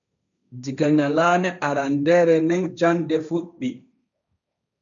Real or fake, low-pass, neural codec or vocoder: fake; 7.2 kHz; codec, 16 kHz, 1.1 kbps, Voila-Tokenizer